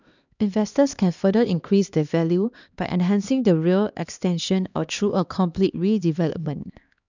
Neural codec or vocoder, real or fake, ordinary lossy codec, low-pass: codec, 16 kHz, 2 kbps, X-Codec, HuBERT features, trained on LibriSpeech; fake; none; 7.2 kHz